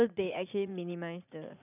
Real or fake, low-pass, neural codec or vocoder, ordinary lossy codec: fake; 3.6 kHz; vocoder, 44.1 kHz, 80 mel bands, Vocos; none